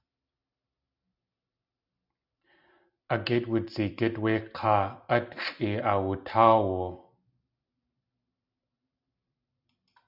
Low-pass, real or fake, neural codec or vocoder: 5.4 kHz; real; none